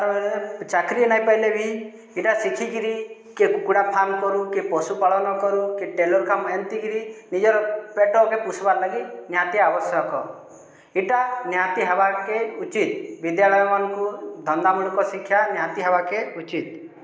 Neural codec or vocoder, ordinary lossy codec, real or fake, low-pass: none; none; real; none